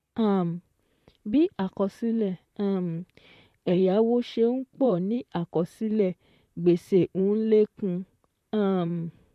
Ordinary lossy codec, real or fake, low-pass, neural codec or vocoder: MP3, 64 kbps; fake; 14.4 kHz; vocoder, 44.1 kHz, 128 mel bands, Pupu-Vocoder